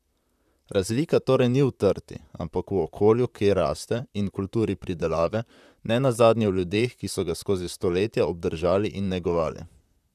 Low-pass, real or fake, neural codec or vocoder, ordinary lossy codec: 14.4 kHz; fake; vocoder, 44.1 kHz, 128 mel bands, Pupu-Vocoder; none